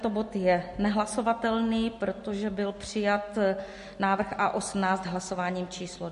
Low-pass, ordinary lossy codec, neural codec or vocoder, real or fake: 14.4 kHz; MP3, 48 kbps; none; real